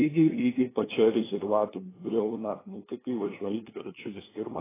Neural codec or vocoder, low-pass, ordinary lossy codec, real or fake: codec, 16 kHz, 2 kbps, FunCodec, trained on LibriTTS, 25 frames a second; 3.6 kHz; AAC, 16 kbps; fake